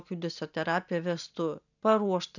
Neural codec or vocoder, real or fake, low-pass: none; real; 7.2 kHz